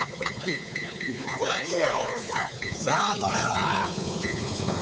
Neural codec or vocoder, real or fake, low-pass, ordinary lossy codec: codec, 16 kHz, 4 kbps, X-Codec, WavLM features, trained on Multilingual LibriSpeech; fake; none; none